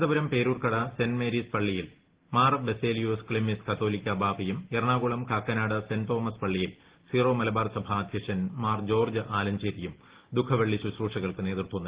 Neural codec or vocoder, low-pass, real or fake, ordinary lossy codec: none; 3.6 kHz; real; Opus, 16 kbps